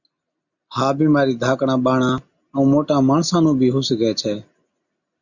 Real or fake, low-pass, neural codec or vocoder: real; 7.2 kHz; none